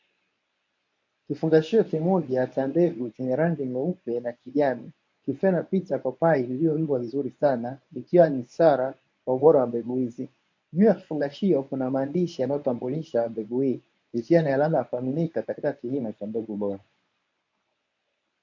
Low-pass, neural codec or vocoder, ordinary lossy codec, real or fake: 7.2 kHz; codec, 24 kHz, 0.9 kbps, WavTokenizer, medium speech release version 1; MP3, 48 kbps; fake